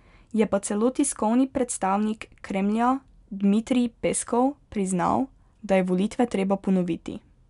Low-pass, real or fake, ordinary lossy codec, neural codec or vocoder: 10.8 kHz; real; none; none